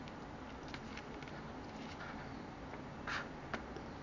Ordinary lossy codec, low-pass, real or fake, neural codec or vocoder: none; 7.2 kHz; real; none